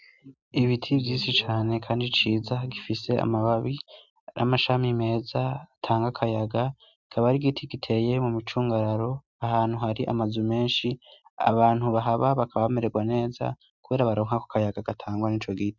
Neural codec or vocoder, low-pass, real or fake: none; 7.2 kHz; real